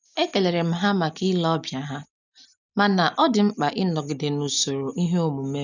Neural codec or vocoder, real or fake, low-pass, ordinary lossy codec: none; real; 7.2 kHz; none